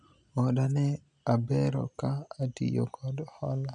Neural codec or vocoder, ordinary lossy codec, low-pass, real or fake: none; none; 9.9 kHz; real